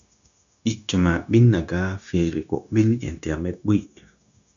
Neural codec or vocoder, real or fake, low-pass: codec, 16 kHz, 0.9 kbps, LongCat-Audio-Codec; fake; 7.2 kHz